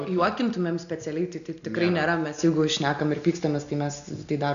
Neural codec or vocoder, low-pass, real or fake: none; 7.2 kHz; real